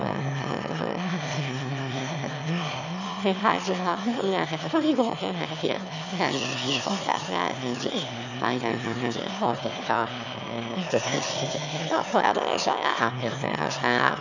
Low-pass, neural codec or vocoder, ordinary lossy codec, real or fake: 7.2 kHz; autoencoder, 22.05 kHz, a latent of 192 numbers a frame, VITS, trained on one speaker; none; fake